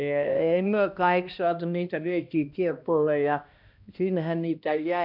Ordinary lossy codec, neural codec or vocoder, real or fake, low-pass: none; codec, 16 kHz, 1 kbps, X-Codec, HuBERT features, trained on balanced general audio; fake; 5.4 kHz